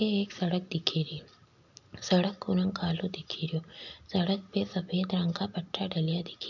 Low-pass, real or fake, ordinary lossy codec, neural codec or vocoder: 7.2 kHz; real; none; none